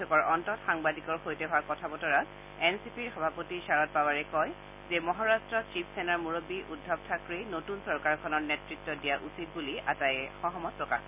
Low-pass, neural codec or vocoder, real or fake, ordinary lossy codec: 3.6 kHz; none; real; none